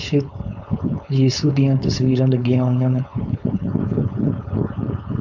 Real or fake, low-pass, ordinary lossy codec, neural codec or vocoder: fake; 7.2 kHz; none; codec, 16 kHz, 4.8 kbps, FACodec